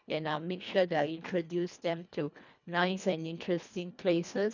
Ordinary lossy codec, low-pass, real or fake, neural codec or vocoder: none; 7.2 kHz; fake; codec, 24 kHz, 1.5 kbps, HILCodec